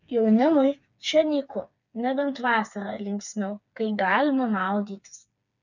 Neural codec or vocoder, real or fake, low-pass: codec, 16 kHz, 4 kbps, FreqCodec, smaller model; fake; 7.2 kHz